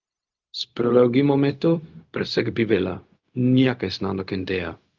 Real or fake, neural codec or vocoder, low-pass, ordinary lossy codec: fake; codec, 16 kHz, 0.4 kbps, LongCat-Audio-Codec; 7.2 kHz; Opus, 24 kbps